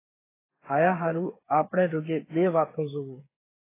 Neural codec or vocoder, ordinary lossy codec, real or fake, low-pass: codec, 16 kHz, 8 kbps, FreqCodec, smaller model; AAC, 24 kbps; fake; 3.6 kHz